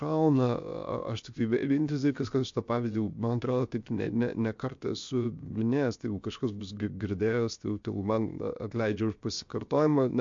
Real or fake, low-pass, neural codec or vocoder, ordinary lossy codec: fake; 7.2 kHz; codec, 16 kHz, 0.7 kbps, FocalCodec; MP3, 48 kbps